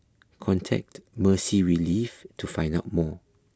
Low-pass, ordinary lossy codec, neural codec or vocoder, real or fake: none; none; none; real